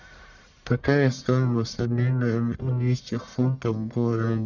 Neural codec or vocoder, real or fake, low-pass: codec, 44.1 kHz, 1.7 kbps, Pupu-Codec; fake; 7.2 kHz